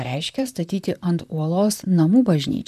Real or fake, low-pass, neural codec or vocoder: fake; 14.4 kHz; vocoder, 44.1 kHz, 128 mel bands, Pupu-Vocoder